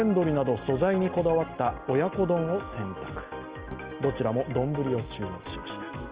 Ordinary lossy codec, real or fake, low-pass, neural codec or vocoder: Opus, 24 kbps; real; 3.6 kHz; none